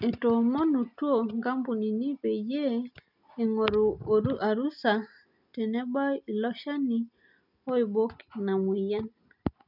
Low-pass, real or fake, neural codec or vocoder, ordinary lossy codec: 5.4 kHz; real; none; none